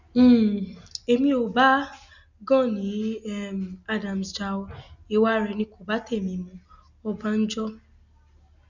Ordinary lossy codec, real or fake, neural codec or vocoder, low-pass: none; real; none; 7.2 kHz